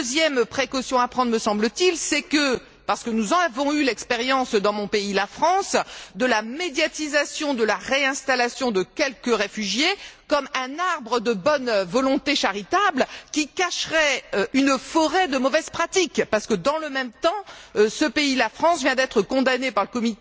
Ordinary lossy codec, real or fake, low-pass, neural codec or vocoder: none; real; none; none